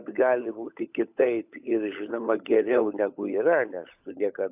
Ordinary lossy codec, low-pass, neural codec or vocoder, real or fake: AAC, 32 kbps; 3.6 kHz; codec, 16 kHz, 16 kbps, FunCodec, trained on LibriTTS, 50 frames a second; fake